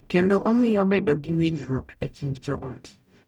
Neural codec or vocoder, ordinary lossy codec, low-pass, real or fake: codec, 44.1 kHz, 0.9 kbps, DAC; none; 19.8 kHz; fake